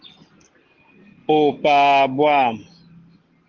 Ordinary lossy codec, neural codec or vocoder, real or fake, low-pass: Opus, 16 kbps; none; real; 7.2 kHz